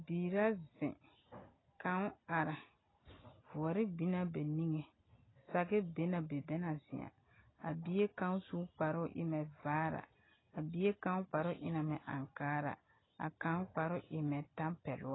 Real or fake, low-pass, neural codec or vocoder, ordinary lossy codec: real; 7.2 kHz; none; AAC, 16 kbps